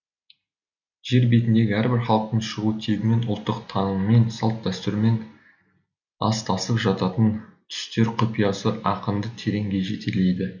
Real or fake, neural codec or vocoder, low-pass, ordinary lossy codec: real; none; 7.2 kHz; none